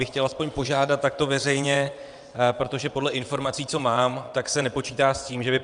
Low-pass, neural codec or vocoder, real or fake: 9.9 kHz; vocoder, 22.05 kHz, 80 mel bands, WaveNeXt; fake